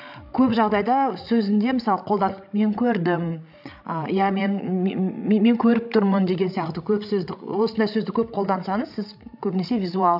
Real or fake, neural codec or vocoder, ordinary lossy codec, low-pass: fake; codec, 16 kHz, 16 kbps, FreqCodec, larger model; AAC, 48 kbps; 5.4 kHz